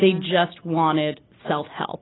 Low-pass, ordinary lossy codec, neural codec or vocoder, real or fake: 7.2 kHz; AAC, 16 kbps; none; real